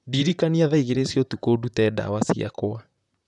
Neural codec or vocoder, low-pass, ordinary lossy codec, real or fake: vocoder, 44.1 kHz, 128 mel bands, Pupu-Vocoder; 10.8 kHz; none; fake